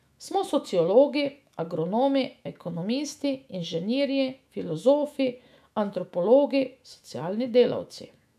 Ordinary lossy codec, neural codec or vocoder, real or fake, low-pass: none; autoencoder, 48 kHz, 128 numbers a frame, DAC-VAE, trained on Japanese speech; fake; 14.4 kHz